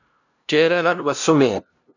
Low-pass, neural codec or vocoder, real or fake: 7.2 kHz; codec, 16 kHz, 0.5 kbps, FunCodec, trained on LibriTTS, 25 frames a second; fake